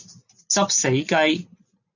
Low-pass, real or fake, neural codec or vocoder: 7.2 kHz; real; none